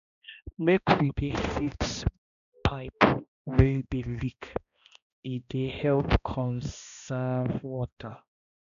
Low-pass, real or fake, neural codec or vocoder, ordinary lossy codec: 7.2 kHz; fake; codec, 16 kHz, 1 kbps, X-Codec, HuBERT features, trained on balanced general audio; none